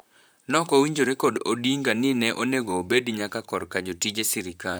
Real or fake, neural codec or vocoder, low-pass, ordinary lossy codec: fake; vocoder, 44.1 kHz, 128 mel bands, Pupu-Vocoder; none; none